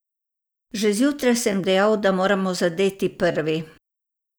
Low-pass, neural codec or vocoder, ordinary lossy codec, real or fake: none; none; none; real